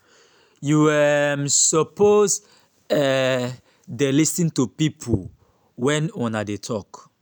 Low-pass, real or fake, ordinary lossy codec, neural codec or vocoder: none; real; none; none